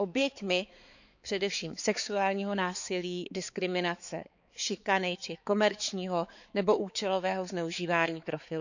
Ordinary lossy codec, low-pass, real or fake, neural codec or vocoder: none; 7.2 kHz; fake; codec, 16 kHz, 4 kbps, X-Codec, HuBERT features, trained on balanced general audio